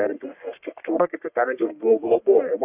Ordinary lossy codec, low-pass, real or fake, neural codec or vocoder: AAC, 32 kbps; 3.6 kHz; fake; codec, 44.1 kHz, 1.7 kbps, Pupu-Codec